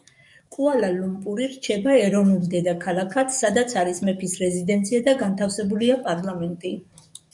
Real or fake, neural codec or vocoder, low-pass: fake; codec, 44.1 kHz, 7.8 kbps, DAC; 10.8 kHz